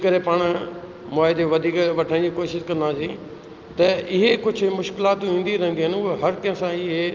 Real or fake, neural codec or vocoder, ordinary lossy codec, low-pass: real; none; Opus, 32 kbps; 7.2 kHz